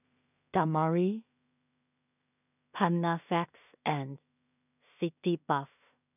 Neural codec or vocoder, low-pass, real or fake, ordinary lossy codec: codec, 16 kHz in and 24 kHz out, 0.4 kbps, LongCat-Audio-Codec, two codebook decoder; 3.6 kHz; fake; none